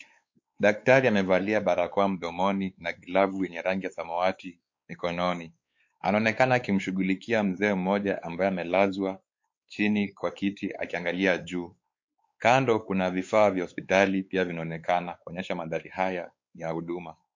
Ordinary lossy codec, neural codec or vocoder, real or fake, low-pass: MP3, 48 kbps; codec, 16 kHz, 4 kbps, X-Codec, WavLM features, trained on Multilingual LibriSpeech; fake; 7.2 kHz